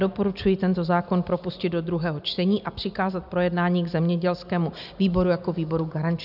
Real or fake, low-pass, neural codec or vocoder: real; 5.4 kHz; none